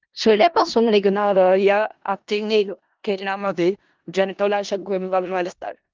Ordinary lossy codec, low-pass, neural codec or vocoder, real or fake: Opus, 32 kbps; 7.2 kHz; codec, 16 kHz in and 24 kHz out, 0.4 kbps, LongCat-Audio-Codec, four codebook decoder; fake